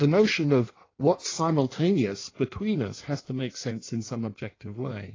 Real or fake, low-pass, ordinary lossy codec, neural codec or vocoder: fake; 7.2 kHz; AAC, 32 kbps; codec, 16 kHz in and 24 kHz out, 1.1 kbps, FireRedTTS-2 codec